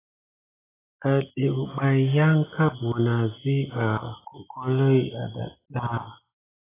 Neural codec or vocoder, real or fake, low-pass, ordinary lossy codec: none; real; 3.6 kHz; AAC, 16 kbps